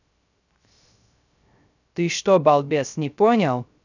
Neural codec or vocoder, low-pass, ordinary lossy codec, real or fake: codec, 16 kHz, 0.3 kbps, FocalCodec; 7.2 kHz; none; fake